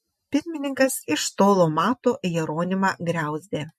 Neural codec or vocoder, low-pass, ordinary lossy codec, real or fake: none; 14.4 kHz; MP3, 64 kbps; real